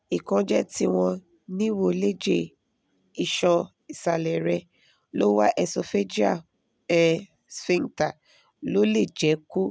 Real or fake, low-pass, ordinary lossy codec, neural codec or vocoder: real; none; none; none